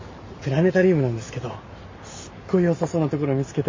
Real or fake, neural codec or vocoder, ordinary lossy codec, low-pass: real; none; MP3, 32 kbps; 7.2 kHz